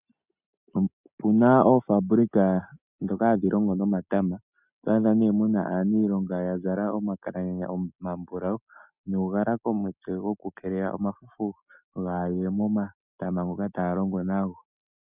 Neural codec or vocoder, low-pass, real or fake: none; 3.6 kHz; real